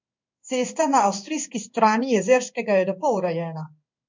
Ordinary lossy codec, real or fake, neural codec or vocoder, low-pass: MP3, 64 kbps; fake; codec, 16 kHz in and 24 kHz out, 1 kbps, XY-Tokenizer; 7.2 kHz